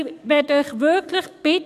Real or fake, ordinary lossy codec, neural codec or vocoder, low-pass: fake; none; codec, 44.1 kHz, 7.8 kbps, DAC; 14.4 kHz